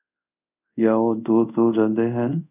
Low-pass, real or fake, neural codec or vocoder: 3.6 kHz; fake; codec, 24 kHz, 0.5 kbps, DualCodec